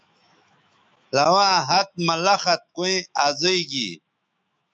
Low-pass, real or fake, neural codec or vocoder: 9.9 kHz; fake; autoencoder, 48 kHz, 128 numbers a frame, DAC-VAE, trained on Japanese speech